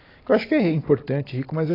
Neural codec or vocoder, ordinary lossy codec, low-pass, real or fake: none; AAC, 24 kbps; 5.4 kHz; real